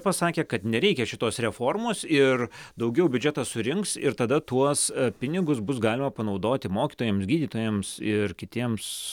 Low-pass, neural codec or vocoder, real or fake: 19.8 kHz; none; real